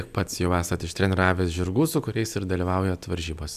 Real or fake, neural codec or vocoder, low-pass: real; none; 14.4 kHz